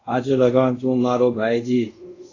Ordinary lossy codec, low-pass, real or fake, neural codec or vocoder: AAC, 32 kbps; 7.2 kHz; fake; codec, 24 kHz, 0.5 kbps, DualCodec